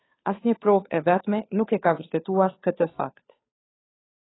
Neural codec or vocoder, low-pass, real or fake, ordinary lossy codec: codec, 16 kHz, 2 kbps, FunCodec, trained on Chinese and English, 25 frames a second; 7.2 kHz; fake; AAC, 16 kbps